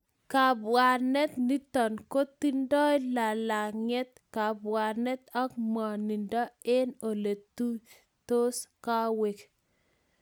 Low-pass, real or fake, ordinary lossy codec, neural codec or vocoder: none; real; none; none